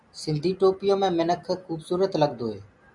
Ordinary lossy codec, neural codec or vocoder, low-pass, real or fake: MP3, 96 kbps; none; 10.8 kHz; real